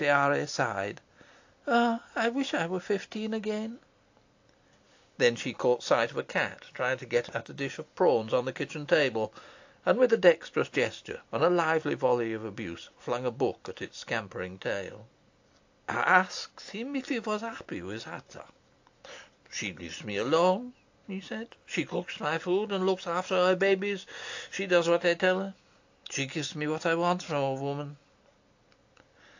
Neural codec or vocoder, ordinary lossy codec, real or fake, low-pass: none; AAC, 48 kbps; real; 7.2 kHz